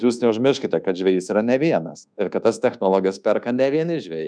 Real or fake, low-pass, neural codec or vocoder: fake; 9.9 kHz; codec, 24 kHz, 1.2 kbps, DualCodec